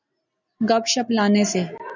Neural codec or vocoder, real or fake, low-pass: none; real; 7.2 kHz